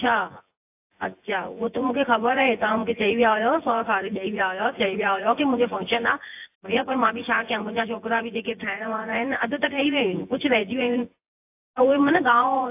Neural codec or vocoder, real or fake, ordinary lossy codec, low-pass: vocoder, 24 kHz, 100 mel bands, Vocos; fake; AAC, 32 kbps; 3.6 kHz